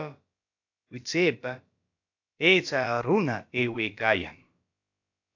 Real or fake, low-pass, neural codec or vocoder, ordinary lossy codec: fake; 7.2 kHz; codec, 16 kHz, about 1 kbps, DyCAST, with the encoder's durations; AAC, 48 kbps